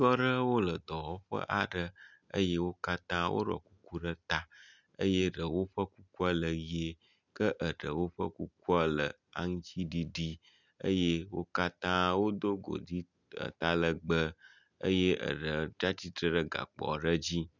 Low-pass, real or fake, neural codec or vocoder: 7.2 kHz; real; none